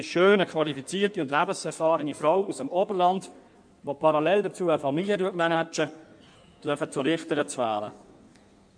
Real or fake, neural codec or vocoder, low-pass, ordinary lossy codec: fake; codec, 16 kHz in and 24 kHz out, 1.1 kbps, FireRedTTS-2 codec; 9.9 kHz; none